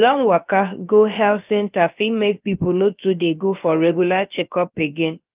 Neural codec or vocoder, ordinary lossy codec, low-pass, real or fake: codec, 16 kHz, about 1 kbps, DyCAST, with the encoder's durations; Opus, 24 kbps; 3.6 kHz; fake